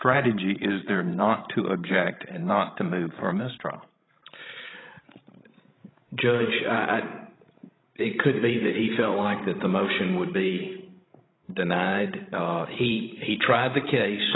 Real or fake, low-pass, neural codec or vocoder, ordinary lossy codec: fake; 7.2 kHz; codec, 16 kHz, 16 kbps, FreqCodec, larger model; AAC, 16 kbps